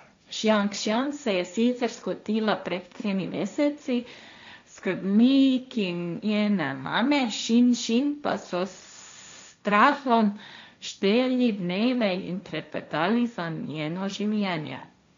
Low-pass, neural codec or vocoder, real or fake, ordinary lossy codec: 7.2 kHz; codec, 16 kHz, 1.1 kbps, Voila-Tokenizer; fake; MP3, 64 kbps